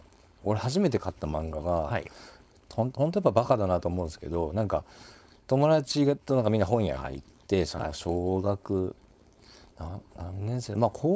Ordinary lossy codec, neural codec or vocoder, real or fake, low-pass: none; codec, 16 kHz, 4.8 kbps, FACodec; fake; none